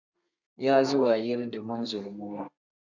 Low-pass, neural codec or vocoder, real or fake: 7.2 kHz; codec, 32 kHz, 1.9 kbps, SNAC; fake